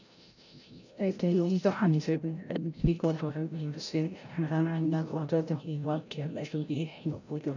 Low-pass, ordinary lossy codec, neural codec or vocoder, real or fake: 7.2 kHz; none; codec, 16 kHz, 0.5 kbps, FreqCodec, larger model; fake